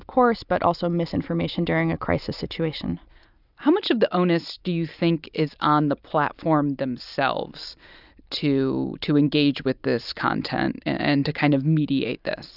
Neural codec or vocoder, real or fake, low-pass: none; real; 5.4 kHz